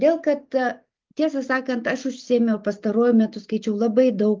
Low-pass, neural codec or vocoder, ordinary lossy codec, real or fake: 7.2 kHz; none; Opus, 32 kbps; real